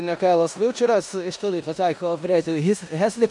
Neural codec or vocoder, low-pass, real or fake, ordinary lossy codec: codec, 16 kHz in and 24 kHz out, 0.9 kbps, LongCat-Audio-Codec, four codebook decoder; 10.8 kHz; fake; MP3, 64 kbps